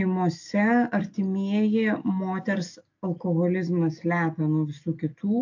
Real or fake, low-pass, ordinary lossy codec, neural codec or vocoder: real; 7.2 kHz; AAC, 48 kbps; none